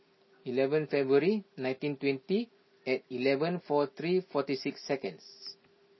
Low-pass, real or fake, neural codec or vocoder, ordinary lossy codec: 7.2 kHz; real; none; MP3, 24 kbps